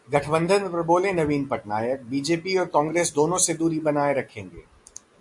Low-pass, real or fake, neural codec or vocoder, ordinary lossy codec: 10.8 kHz; real; none; AAC, 64 kbps